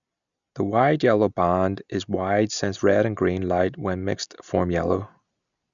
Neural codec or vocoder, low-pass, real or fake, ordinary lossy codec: none; 7.2 kHz; real; none